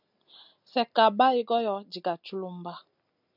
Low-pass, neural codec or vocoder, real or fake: 5.4 kHz; none; real